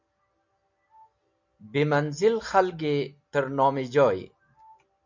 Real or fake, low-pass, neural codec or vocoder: real; 7.2 kHz; none